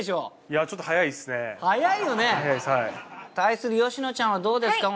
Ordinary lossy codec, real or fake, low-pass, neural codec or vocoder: none; real; none; none